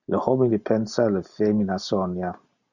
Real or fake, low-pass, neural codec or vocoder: real; 7.2 kHz; none